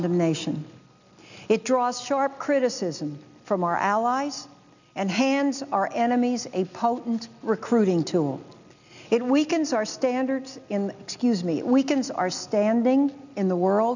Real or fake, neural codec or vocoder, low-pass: real; none; 7.2 kHz